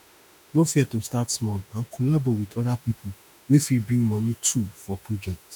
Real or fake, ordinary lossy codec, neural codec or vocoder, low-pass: fake; none; autoencoder, 48 kHz, 32 numbers a frame, DAC-VAE, trained on Japanese speech; none